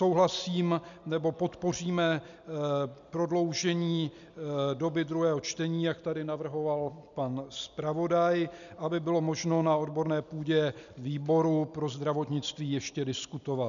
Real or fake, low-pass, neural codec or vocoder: real; 7.2 kHz; none